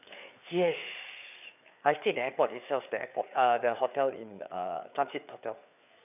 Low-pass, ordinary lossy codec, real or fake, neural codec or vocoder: 3.6 kHz; none; fake; codec, 16 kHz, 4 kbps, FreqCodec, larger model